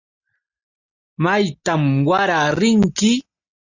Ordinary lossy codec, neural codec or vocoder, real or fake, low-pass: Opus, 64 kbps; none; real; 7.2 kHz